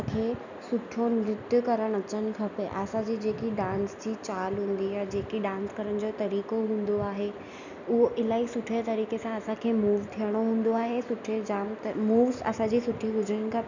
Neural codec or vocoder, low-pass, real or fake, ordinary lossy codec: none; 7.2 kHz; real; none